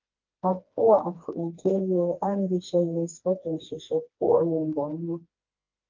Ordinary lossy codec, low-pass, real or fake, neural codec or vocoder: Opus, 32 kbps; 7.2 kHz; fake; codec, 16 kHz, 2 kbps, FreqCodec, smaller model